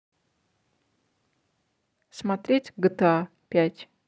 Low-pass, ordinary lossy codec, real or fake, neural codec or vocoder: none; none; real; none